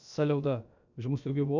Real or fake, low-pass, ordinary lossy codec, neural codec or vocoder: fake; 7.2 kHz; none; codec, 16 kHz, about 1 kbps, DyCAST, with the encoder's durations